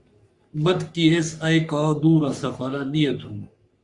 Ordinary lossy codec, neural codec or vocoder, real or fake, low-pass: Opus, 64 kbps; codec, 44.1 kHz, 3.4 kbps, Pupu-Codec; fake; 10.8 kHz